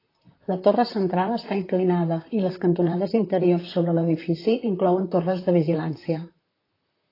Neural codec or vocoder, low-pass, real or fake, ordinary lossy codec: vocoder, 44.1 kHz, 128 mel bands, Pupu-Vocoder; 5.4 kHz; fake; AAC, 24 kbps